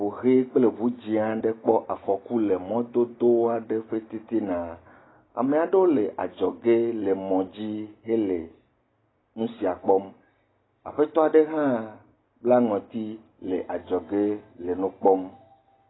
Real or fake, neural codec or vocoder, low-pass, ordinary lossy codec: real; none; 7.2 kHz; AAC, 16 kbps